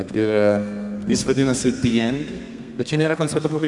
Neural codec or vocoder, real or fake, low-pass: codec, 32 kHz, 1.9 kbps, SNAC; fake; 10.8 kHz